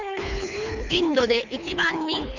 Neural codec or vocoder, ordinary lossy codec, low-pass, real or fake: codec, 24 kHz, 3 kbps, HILCodec; none; 7.2 kHz; fake